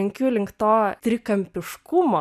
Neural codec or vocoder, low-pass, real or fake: none; 14.4 kHz; real